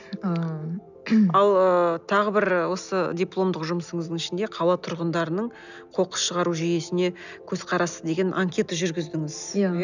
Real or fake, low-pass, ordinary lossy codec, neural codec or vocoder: real; 7.2 kHz; none; none